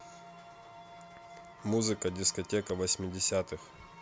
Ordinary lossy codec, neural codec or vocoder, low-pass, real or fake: none; none; none; real